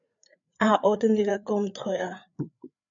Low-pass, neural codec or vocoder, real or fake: 7.2 kHz; codec, 16 kHz, 4 kbps, FreqCodec, larger model; fake